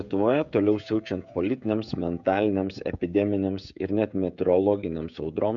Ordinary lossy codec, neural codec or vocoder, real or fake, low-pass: MP3, 64 kbps; codec, 16 kHz, 16 kbps, FreqCodec, smaller model; fake; 7.2 kHz